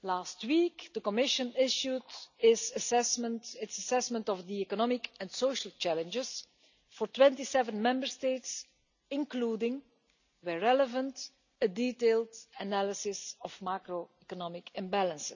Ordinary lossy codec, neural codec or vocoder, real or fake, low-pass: none; none; real; 7.2 kHz